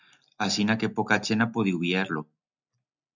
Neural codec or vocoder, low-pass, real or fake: none; 7.2 kHz; real